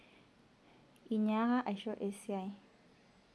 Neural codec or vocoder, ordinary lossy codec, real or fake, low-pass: none; none; real; none